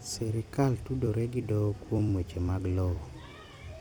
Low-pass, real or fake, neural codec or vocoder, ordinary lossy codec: 19.8 kHz; fake; vocoder, 44.1 kHz, 128 mel bands every 256 samples, BigVGAN v2; none